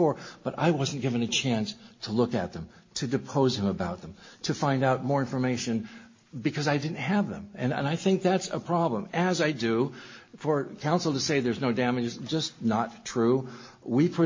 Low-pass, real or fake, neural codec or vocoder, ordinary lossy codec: 7.2 kHz; real; none; MP3, 32 kbps